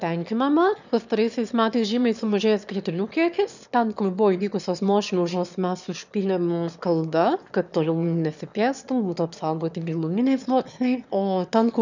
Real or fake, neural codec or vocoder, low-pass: fake; autoencoder, 22.05 kHz, a latent of 192 numbers a frame, VITS, trained on one speaker; 7.2 kHz